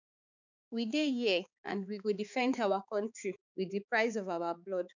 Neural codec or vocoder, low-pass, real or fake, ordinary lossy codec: codec, 16 kHz, 4 kbps, X-Codec, HuBERT features, trained on balanced general audio; 7.2 kHz; fake; none